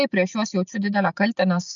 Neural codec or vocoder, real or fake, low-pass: none; real; 7.2 kHz